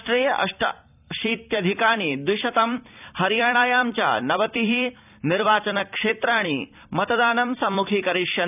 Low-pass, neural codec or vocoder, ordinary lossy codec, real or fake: 3.6 kHz; vocoder, 44.1 kHz, 128 mel bands every 256 samples, BigVGAN v2; none; fake